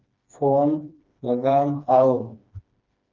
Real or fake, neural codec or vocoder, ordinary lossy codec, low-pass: fake; codec, 16 kHz, 2 kbps, FreqCodec, smaller model; Opus, 24 kbps; 7.2 kHz